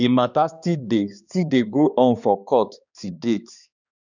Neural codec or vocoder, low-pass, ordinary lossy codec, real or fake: codec, 16 kHz, 2 kbps, X-Codec, HuBERT features, trained on balanced general audio; 7.2 kHz; none; fake